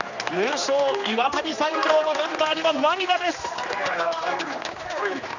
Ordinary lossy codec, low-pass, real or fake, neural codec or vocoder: none; 7.2 kHz; fake; codec, 16 kHz, 2 kbps, X-Codec, HuBERT features, trained on general audio